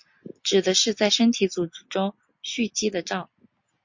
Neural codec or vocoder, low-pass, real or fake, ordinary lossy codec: none; 7.2 kHz; real; MP3, 48 kbps